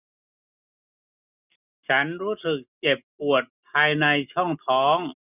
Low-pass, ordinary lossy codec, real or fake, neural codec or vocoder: 3.6 kHz; none; real; none